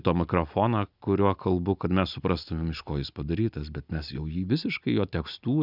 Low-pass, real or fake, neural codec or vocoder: 5.4 kHz; real; none